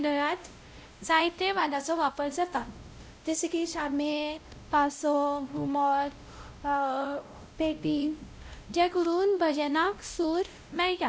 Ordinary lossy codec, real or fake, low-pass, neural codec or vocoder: none; fake; none; codec, 16 kHz, 0.5 kbps, X-Codec, WavLM features, trained on Multilingual LibriSpeech